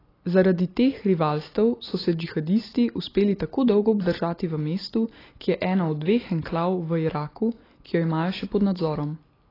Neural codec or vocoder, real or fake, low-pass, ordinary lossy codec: none; real; 5.4 kHz; AAC, 24 kbps